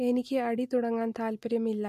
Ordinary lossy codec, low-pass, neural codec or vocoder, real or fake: MP3, 64 kbps; 14.4 kHz; none; real